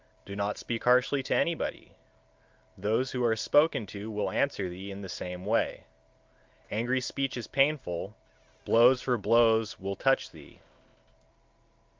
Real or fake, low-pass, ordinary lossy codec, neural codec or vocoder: real; 7.2 kHz; Opus, 32 kbps; none